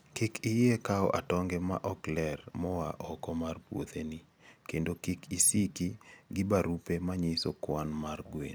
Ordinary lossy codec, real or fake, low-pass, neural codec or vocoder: none; real; none; none